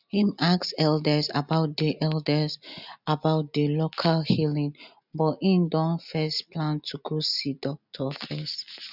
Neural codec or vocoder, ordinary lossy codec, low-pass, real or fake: none; none; 5.4 kHz; real